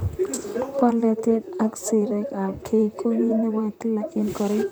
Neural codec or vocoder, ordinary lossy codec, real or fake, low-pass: vocoder, 44.1 kHz, 128 mel bands, Pupu-Vocoder; none; fake; none